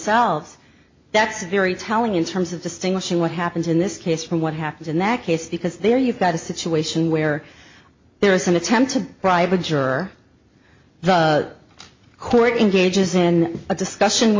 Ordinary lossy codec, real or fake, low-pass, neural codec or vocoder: MP3, 32 kbps; real; 7.2 kHz; none